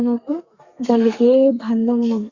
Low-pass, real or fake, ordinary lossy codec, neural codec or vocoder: 7.2 kHz; fake; Opus, 64 kbps; codec, 32 kHz, 1.9 kbps, SNAC